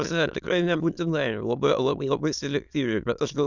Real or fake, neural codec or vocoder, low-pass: fake; autoencoder, 22.05 kHz, a latent of 192 numbers a frame, VITS, trained on many speakers; 7.2 kHz